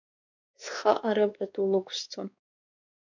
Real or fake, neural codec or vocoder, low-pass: fake; codec, 16 kHz, 2 kbps, X-Codec, WavLM features, trained on Multilingual LibriSpeech; 7.2 kHz